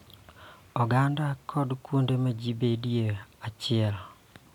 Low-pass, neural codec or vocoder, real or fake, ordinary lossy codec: 19.8 kHz; none; real; none